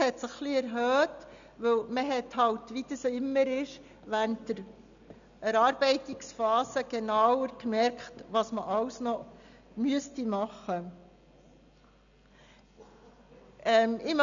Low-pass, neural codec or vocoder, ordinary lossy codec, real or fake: 7.2 kHz; none; none; real